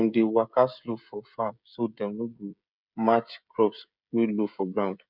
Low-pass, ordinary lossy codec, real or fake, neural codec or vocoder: 5.4 kHz; none; fake; codec, 16 kHz, 16 kbps, FreqCodec, smaller model